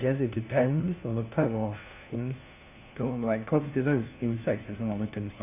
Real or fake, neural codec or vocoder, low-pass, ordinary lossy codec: fake; codec, 16 kHz, 1 kbps, FunCodec, trained on LibriTTS, 50 frames a second; 3.6 kHz; AAC, 32 kbps